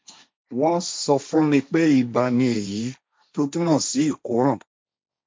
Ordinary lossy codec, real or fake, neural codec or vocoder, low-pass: none; fake; codec, 16 kHz, 1.1 kbps, Voila-Tokenizer; none